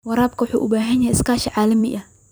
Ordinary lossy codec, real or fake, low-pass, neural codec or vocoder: none; fake; none; vocoder, 44.1 kHz, 128 mel bands every 256 samples, BigVGAN v2